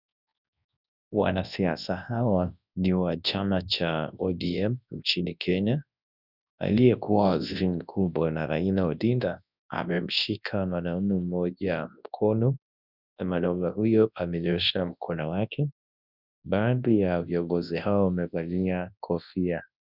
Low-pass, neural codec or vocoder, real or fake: 5.4 kHz; codec, 24 kHz, 0.9 kbps, WavTokenizer, large speech release; fake